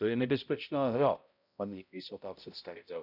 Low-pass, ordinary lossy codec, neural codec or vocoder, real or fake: 5.4 kHz; none; codec, 16 kHz, 0.5 kbps, X-Codec, HuBERT features, trained on balanced general audio; fake